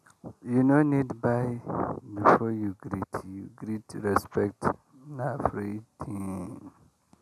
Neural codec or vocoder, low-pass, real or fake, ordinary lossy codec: none; 14.4 kHz; real; none